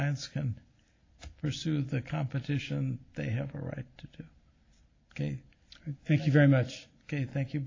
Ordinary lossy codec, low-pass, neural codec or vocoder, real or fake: AAC, 32 kbps; 7.2 kHz; none; real